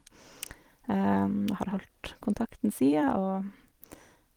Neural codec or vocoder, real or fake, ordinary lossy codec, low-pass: vocoder, 44.1 kHz, 128 mel bands every 256 samples, BigVGAN v2; fake; Opus, 24 kbps; 19.8 kHz